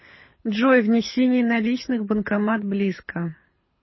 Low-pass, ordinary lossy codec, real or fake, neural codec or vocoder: 7.2 kHz; MP3, 24 kbps; fake; codec, 24 kHz, 6 kbps, HILCodec